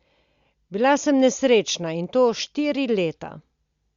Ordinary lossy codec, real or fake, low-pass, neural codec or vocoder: Opus, 64 kbps; real; 7.2 kHz; none